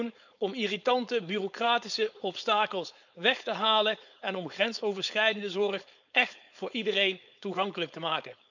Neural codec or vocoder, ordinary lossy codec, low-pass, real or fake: codec, 16 kHz, 4.8 kbps, FACodec; none; 7.2 kHz; fake